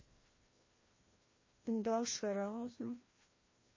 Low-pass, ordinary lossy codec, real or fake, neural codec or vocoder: 7.2 kHz; MP3, 32 kbps; fake; codec, 16 kHz, 1 kbps, FreqCodec, larger model